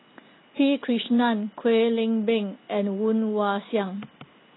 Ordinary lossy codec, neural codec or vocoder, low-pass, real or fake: AAC, 16 kbps; none; 7.2 kHz; real